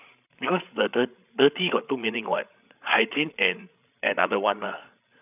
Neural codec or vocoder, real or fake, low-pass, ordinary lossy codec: codec, 16 kHz, 8 kbps, FreqCodec, larger model; fake; 3.6 kHz; none